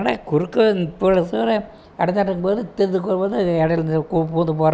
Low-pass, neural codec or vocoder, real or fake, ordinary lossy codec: none; none; real; none